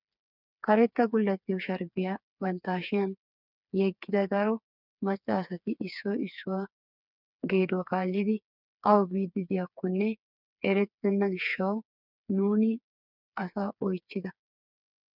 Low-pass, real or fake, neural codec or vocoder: 5.4 kHz; fake; codec, 16 kHz, 4 kbps, FreqCodec, smaller model